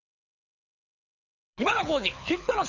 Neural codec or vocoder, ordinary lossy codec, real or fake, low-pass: codec, 24 kHz, 6 kbps, HILCodec; none; fake; 7.2 kHz